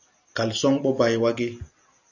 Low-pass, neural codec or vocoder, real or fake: 7.2 kHz; none; real